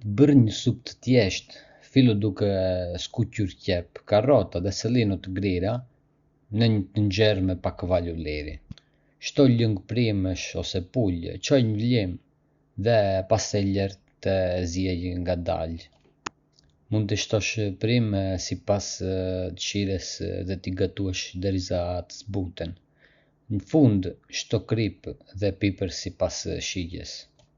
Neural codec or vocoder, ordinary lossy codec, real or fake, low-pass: none; Opus, 64 kbps; real; 7.2 kHz